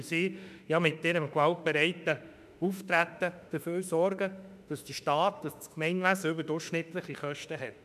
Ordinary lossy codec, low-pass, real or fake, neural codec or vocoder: none; 14.4 kHz; fake; autoencoder, 48 kHz, 32 numbers a frame, DAC-VAE, trained on Japanese speech